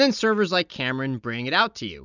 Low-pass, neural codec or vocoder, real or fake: 7.2 kHz; none; real